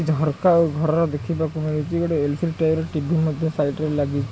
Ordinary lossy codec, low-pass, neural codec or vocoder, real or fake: none; none; none; real